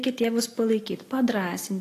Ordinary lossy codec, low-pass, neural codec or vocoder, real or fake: AAC, 48 kbps; 14.4 kHz; none; real